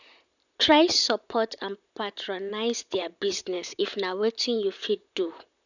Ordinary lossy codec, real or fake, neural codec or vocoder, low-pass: none; fake; vocoder, 44.1 kHz, 128 mel bands, Pupu-Vocoder; 7.2 kHz